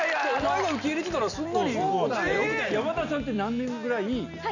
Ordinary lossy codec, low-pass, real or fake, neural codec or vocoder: none; 7.2 kHz; real; none